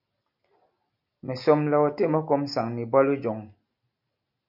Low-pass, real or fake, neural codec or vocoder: 5.4 kHz; real; none